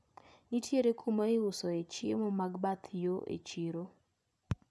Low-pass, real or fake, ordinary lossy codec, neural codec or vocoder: none; fake; none; vocoder, 24 kHz, 100 mel bands, Vocos